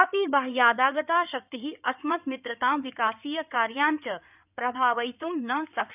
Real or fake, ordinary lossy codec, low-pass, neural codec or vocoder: fake; none; 3.6 kHz; codec, 16 kHz in and 24 kHz out, 2.2 kbps, FireRedTTS-2 codec